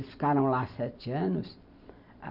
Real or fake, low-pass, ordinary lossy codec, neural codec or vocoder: real; 5.4 kHz; none; none